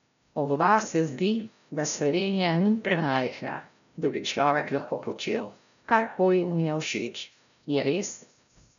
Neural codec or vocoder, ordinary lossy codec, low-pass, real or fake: codec, 16 kHz, 0.5 kbps, FreqCodec, larger model; none; 7.2 kHz; fake